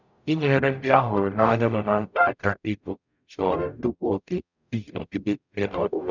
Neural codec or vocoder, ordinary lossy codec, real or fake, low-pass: codec, 44.1 kHz, 0.9 kbps, DAC; none; fake; 7.2 kHz